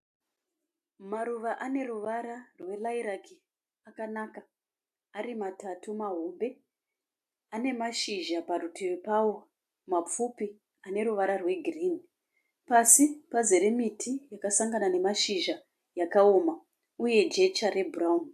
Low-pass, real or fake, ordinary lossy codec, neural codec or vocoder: 14.4 kHz; real; AAC, 96 kbps; none